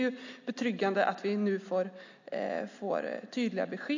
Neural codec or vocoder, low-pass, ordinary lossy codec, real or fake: none; 7.2 kHz; AAC, 48 kbps; real